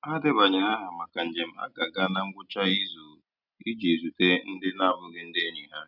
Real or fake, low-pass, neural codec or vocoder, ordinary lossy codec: real; 5.4 kHz; none; none